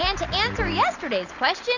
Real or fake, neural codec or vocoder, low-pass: real; none; 7.2 kHz